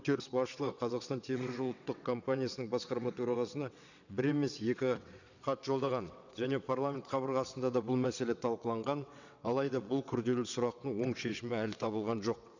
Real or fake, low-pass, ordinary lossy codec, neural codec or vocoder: fake; 7.2 kHz; none; vocoder, 22.05 kHz, 80 mel bands, WaveNeXt